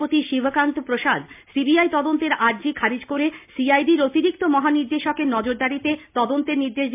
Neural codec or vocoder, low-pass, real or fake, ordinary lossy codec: none; 3.6 kHz; real; MP3, 32 kbps